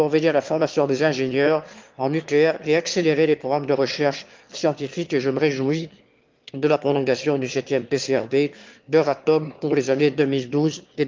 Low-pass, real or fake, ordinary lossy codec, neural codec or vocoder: 7.2 kHz; fake; Opus, 32 kbps; autoencoder, 22.05 kHz, a latent of 192 numbers a frame, VITS, trained on one speaker